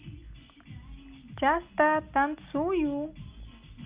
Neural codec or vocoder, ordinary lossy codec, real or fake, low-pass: none; Opus, 24 kbps; real; 3.6 kHz